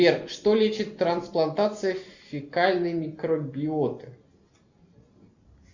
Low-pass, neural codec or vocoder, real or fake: 7.2 kHz; none; real